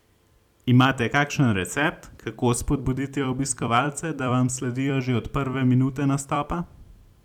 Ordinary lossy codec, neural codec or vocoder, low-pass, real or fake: none; vocoder, 44.1 kHz, 128 mel bands every 256 samples, BigVGAN v2; 19.8 kHz; fake